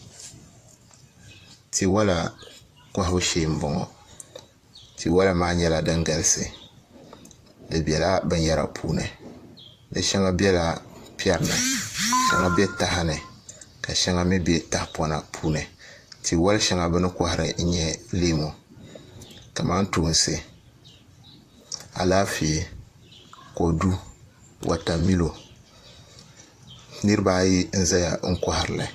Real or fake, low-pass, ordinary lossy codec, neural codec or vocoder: fake; 14.4 kHz; AAC, 64 kbps; vocoder, 44.1 kHz, 128 mel bands, Pupu-Vocoder